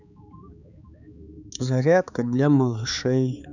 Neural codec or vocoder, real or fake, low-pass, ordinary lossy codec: codec, 16 kHz, 4 kbps, X-Codec, HuBERT features, trained on balanced general audio; fake; 7.2 kHz; none